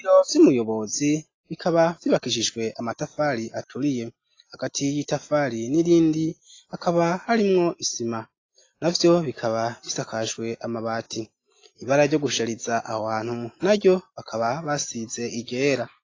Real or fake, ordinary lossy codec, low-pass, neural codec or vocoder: real; AAC, 32 kbps; 7.2 kHz; none